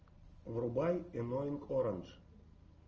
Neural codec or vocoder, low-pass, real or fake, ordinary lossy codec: none; 7.2 kHz; real; Opus, 24 kbps